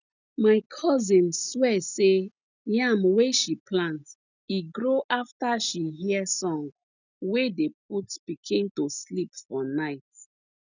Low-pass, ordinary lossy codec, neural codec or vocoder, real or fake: 7.2 kHz; none; none; real